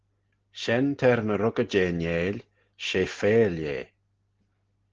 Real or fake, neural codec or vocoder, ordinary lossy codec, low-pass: real; none; Opus, 16 kbps; 7.2 kHz